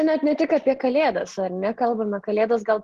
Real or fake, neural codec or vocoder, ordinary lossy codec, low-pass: real; none; Opus, 16 kbps; 14.4 kHz